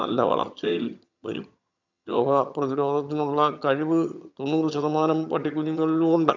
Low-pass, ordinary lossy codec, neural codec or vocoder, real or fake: 7.2 kHz; none; vocoder, 22.05 kHz, 80 mel bands, HiFi-GAN; fake